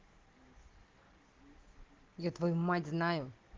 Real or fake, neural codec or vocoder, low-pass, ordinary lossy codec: real; none; 7.2 kHz; Opus, 16 kbps